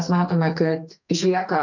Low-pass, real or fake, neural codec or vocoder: 7.2 kHz; fake; codec, 44.1 kHz, 2.6 kbps, SNAC